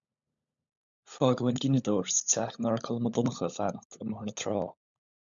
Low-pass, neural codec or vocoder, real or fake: 7.2 kHz; codec, 16 kHz, 16 kbps, FunCodec, trained on LibriTTS, 50 frames a second; fake